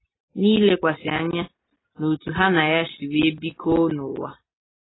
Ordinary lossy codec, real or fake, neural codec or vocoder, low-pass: AAC, 16 kbps; real; none; 7.2 kHz